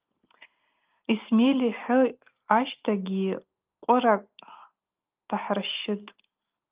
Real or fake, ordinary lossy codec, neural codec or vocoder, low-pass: real; Opus, 24 kbps; none; 3.6 kHz